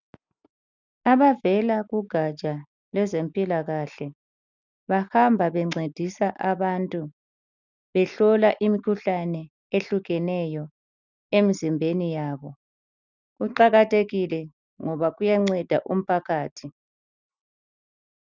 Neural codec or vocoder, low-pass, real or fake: none; 7.2 kHz; real